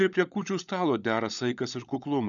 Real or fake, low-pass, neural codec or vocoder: fake; 7.2 kHz; codec, 16 kHz, 16 kbps, FunCodec, trained on LibriTTS, 50 frames a second